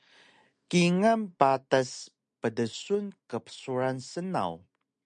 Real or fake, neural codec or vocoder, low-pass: real; none; 9.9 kHz